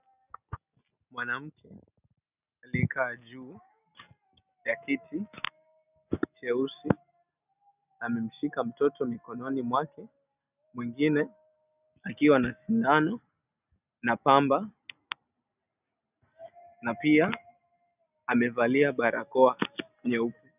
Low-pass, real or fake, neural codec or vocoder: 3.6 kHz; real; none